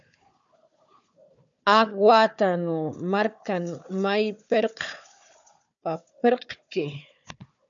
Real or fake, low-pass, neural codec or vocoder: fake; 7.2 kHz; codec, 16 kHz, 4 kbps, FunCodec, trained on Chinese and English, 50 frames a second